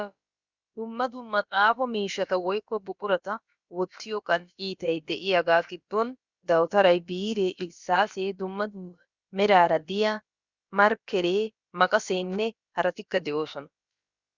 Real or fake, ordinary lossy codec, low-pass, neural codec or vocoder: fake; Opus, 64 kbps; 7.2 kHz; codec, 16 kHz, about 1 kbps, DyCAST, with the encoder's durations